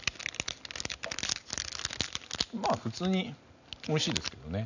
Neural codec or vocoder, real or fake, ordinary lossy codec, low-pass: none; real; none; 7.2 kHz